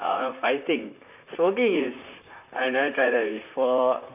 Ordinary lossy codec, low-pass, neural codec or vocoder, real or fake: none; 3.6 kHz; vocoder, 44.1 kHz, 128 mel bands, Pupu-Vocoder; fake